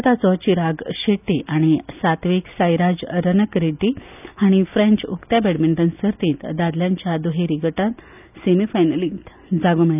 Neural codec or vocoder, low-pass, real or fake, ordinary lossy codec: none; 3.6 kHz; real; none